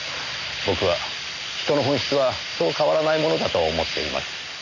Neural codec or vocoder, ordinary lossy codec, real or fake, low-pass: none; none; real; 7.2 kHz